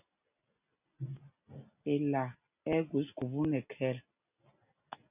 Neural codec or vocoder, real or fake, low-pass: none; real; 3.6 kHz